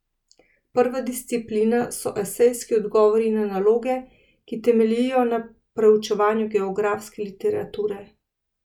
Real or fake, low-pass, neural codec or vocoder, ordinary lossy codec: real; 19.8 kHz; none; none